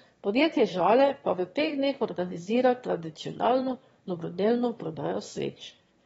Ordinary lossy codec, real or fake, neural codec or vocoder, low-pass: AAC, 24 kbps; fake; autoencoder, 22.05 kHz, a latent of 192 numbers a frame, VITS, trained on one speaker; 9.9 kHz